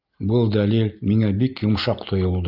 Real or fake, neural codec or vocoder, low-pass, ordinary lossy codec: real; none; 5.4 kHz; Opus, 32 kbps